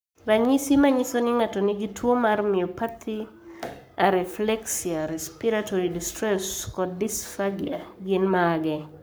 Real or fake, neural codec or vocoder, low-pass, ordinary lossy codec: fake; codec, 44.1 kHz, 7.8 kbps, Pupu-Codec; none; none